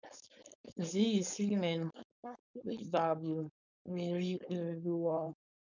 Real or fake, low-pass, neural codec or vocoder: fake; 7.2 kHz; codec, 16 kHz, 4.8 kbps, FACodec